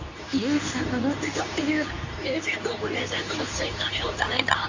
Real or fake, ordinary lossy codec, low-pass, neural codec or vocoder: fake; AAC, 48 kbps; 7.2 kHz; codec, 24 kHz, 0.9 kbps, WavTokenizer, medium speech release version 2